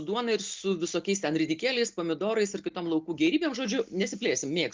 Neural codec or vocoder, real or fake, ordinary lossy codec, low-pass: none; real; Opus, 32 kbps; 7.2 kHz